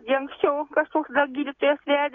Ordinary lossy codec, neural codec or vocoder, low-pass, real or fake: AAC, 32 kbps; none; 7.2 kHz; real